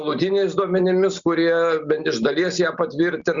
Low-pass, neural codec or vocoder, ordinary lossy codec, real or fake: 7.2 kHz; none; Opus, 64 kbps; real